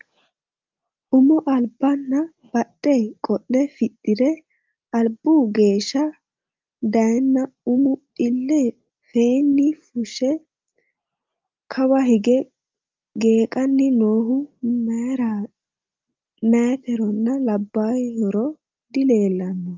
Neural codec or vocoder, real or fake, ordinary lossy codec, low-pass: none; real; Opus, 24 kbps; 7.2 kHz